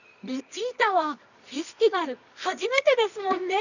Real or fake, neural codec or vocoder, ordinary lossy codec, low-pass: fake; codec, 24 kHz, 0.9 kbps, WavTokenizer, medium music audio release; none; 7.2 kHz